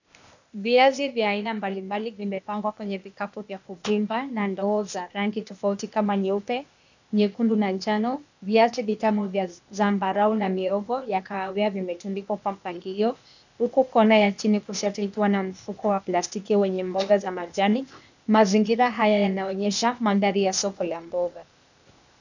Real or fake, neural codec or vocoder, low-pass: fake; codec, 16 kHz, 0.8 kbps, ZipCodec; 7.2 kHz